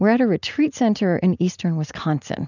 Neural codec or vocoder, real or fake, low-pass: none; real; 7.2 kHz